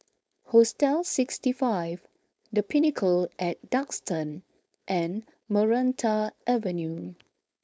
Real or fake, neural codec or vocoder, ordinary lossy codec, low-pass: fake; codec, 16 kHz, 4.8 kbps, FACodec; none; none